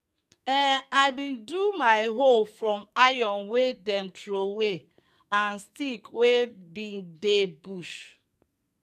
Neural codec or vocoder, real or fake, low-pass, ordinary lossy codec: codec, 44.1 kHz, 2.6 kbps, SNAC; fake; 14.4 kHz; none